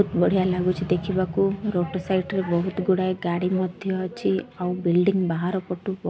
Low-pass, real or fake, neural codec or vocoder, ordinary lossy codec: none; real; none; none